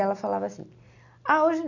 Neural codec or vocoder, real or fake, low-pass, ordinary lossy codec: none; real; 7.2 kHz; none